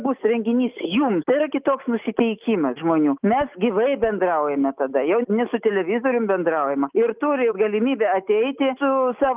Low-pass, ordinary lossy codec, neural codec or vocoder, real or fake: 3.6 kHz; Opus, 32 kbps; none; real